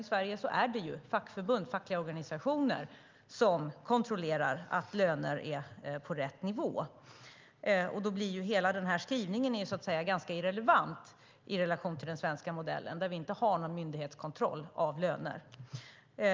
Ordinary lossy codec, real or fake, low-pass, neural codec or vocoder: Opus, 24 kbps; real; 7.2 kHz; none